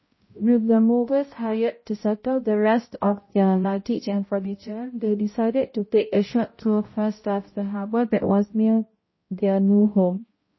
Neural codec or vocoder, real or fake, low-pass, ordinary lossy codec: codec, 16 kHz, 0.5 kbps, X-Codec, HuBERT features, trained on balanced general audio; fake; 7.2 kHz; MP3, 24 kbps